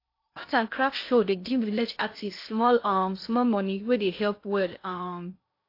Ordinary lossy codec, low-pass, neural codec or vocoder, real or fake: AAC, 32 kbps; 5.4 kHz; codec, 16 kHz in and 24 kHz out, 0.6 kbps, FocalCodec, streaming, 2048 codes; fake